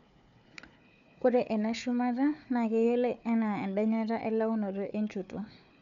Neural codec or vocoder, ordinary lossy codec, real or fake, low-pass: codec, 16 kHz, 4 kbps, FunCodec, trained on Chinese and English, 50 frames a second; none; fake; 7.2 kHz